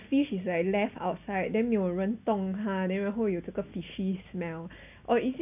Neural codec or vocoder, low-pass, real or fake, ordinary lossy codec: none; 3.6 kHz; real; none